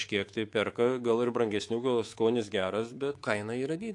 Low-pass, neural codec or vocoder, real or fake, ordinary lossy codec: 10.8 kHz; codec, 24 kHz, 3.1 kbps, DualCodec; fake; AAC, 48 kbps